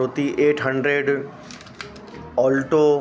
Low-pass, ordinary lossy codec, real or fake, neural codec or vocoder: none; none; real; none